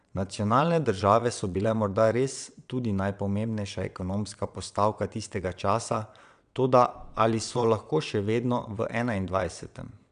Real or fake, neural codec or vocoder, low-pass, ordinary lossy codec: fake; vocoder, 22.05 kHz, 80 mel bands, WaveNeXt; 9.9 kHz; none